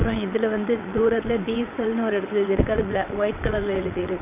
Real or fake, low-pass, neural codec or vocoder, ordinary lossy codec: fake; 3.6 kHz; vocoder, 22.05 kHz, 80 mel bands, WaveNeXt; none